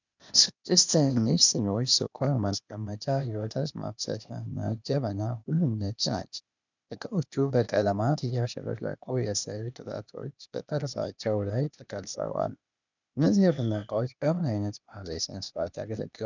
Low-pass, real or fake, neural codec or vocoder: 7.2 kHz; fake; codec, 16 kHz, 0.8 kbps, ZipCodec